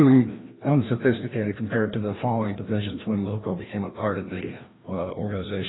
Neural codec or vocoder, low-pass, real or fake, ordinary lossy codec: codec, 16 kHz, 1 kbps, FreqCodec, larger model; 7.2 kHz; fake; AAC, 16 kbps